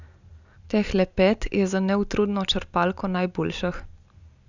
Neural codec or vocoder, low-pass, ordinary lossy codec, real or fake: none; 7.2 kHz; none; real